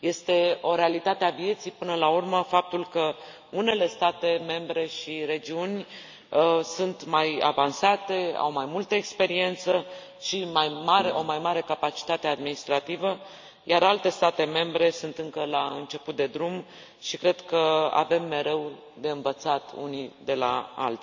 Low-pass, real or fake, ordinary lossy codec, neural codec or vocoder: 7.2 kHz; real; MP3, 64 kbps; none